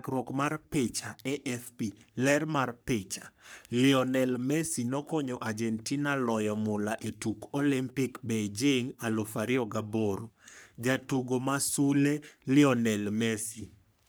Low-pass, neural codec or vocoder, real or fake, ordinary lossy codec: none; codec, 44.1 kHz, 3.4 kbps, Pupu-Codec; fake; none